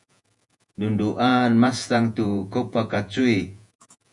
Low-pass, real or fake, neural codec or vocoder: 10.8 kHz; fake; vocoder, 48 kHz, 128 mel bands, Vocos